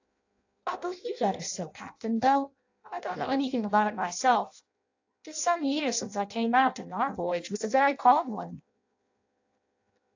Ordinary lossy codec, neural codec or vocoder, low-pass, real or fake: AAC, 48 kbps; codec, 16 kHz in and 24 kHz out, 0.6 kbps, FireRedTTS-2 codec; 7.2 kHz; fake